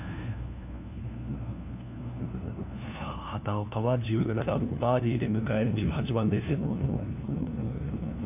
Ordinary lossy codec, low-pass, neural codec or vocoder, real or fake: none; 3.6 kHz; codec, 16 kHz, 1 kbps, FunCodec, trained on LibriTTS, 50 frames a second; fake